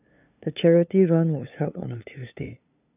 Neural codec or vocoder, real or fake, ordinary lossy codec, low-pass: codec, 16 kHz, 2 kbps, FunCodec, trained on LibriTTS, 25 frames a second; fake; none; 3.6 kHz